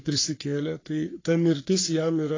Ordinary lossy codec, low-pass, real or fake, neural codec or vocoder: AAC, 32 kbps; 7.2 kHz; fake; codec, 44.1 kHz, 3.4 kbps, Pupu-Codec